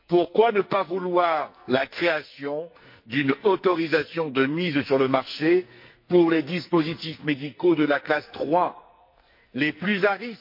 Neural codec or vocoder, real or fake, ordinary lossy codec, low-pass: codec, 44.1 kHz, 2.6 kbps, SNAC; fake; MP3, 32 kbps; 5.4 kHz